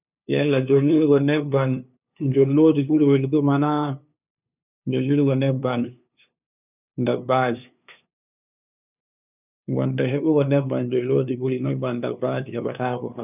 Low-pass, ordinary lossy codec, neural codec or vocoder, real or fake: 3.6 kHz; none; codec, 16 kHz, 2 kbps, FunCodec, trained on LibriTTS, 25 frames a second; fake